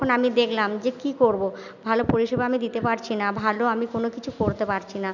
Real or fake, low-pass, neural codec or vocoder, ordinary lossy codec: real; 7.2 kHz; none; none